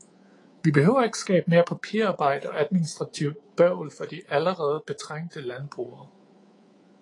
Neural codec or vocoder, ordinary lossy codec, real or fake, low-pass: codec, 24 kHz, 3.1 kbps, DualCodec; AAC, 32 kbps; fake; 10.8 kHz